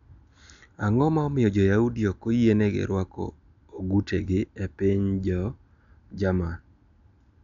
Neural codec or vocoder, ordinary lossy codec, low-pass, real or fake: none; none; 7.2 kHz; real